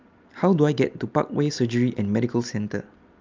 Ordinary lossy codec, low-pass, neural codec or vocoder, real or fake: Opus, 24 kbps; 7.2 kHz; none; real